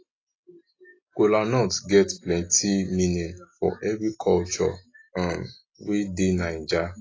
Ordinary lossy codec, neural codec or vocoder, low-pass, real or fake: AAC, 32 kbps; none; 7.2 kHz; real